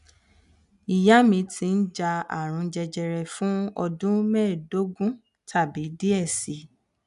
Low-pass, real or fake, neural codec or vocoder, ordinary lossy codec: 10.8 kHz; real; none; none